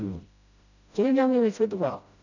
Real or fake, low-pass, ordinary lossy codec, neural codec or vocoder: fake; 7.2 kHz; none; codec, 16 kHz, 0.5 kbps, FreqCodec, smaller model